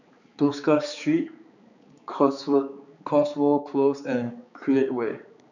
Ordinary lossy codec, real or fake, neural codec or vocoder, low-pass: none; fake; codec, 16 kHz, 4 kbps, X-Codec, HuBERT features, trained on balanced general audio; 7.2 kHz